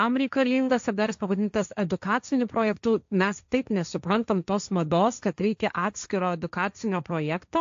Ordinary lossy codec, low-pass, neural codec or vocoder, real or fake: AAC, 64 kbps; 7.2 kHz; codec, 16 kHz, 1.1 kbps, Voila-Tokenizer; fake